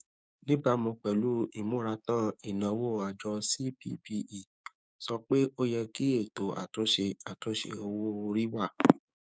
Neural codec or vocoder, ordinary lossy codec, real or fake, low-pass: codec, 16 kHz, 6 kbps, DAC; none; fake; none